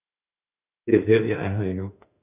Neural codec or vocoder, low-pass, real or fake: autoencoder, 48 kHz, 32 numbers a frame, DAC-VAE, trained on Japanese speech; 3.6 kHz; fake